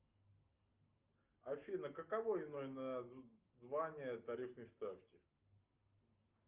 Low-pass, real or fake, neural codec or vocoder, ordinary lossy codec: 3.6 kHz; real; none; Opus, 24 kbps